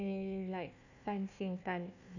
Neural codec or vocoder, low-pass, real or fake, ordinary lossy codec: codec, 16 kHz, 1 kbps, FunCodec, trained on Chinese and English, 50 frames a second; 7.2 kHz; fake; none